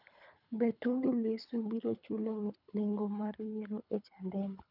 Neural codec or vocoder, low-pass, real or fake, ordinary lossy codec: codec, 24 kHz, 3 kbps, HILCodec; 5.4 kHz; fake; none